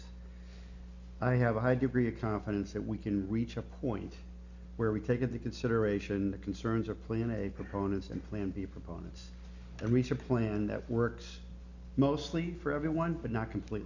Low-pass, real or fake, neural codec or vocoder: 7.2 kHz; real; none